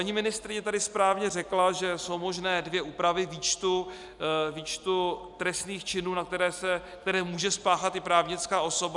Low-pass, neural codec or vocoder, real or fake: 10.8 kHz; none; real